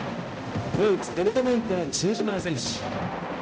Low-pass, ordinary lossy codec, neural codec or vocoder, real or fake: none; none; codec, 16 kHz, 0.5 kbps, X-Codec, HuBERT features, trained on balanced general audio; fake